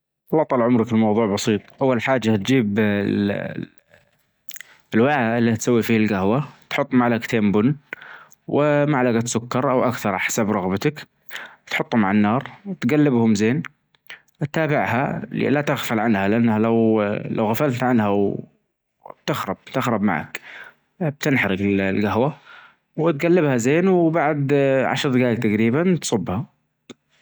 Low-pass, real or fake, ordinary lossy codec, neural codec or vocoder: none; real; none; none